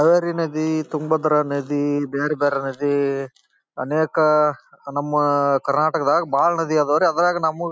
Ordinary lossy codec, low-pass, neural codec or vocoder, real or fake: none; none; none; real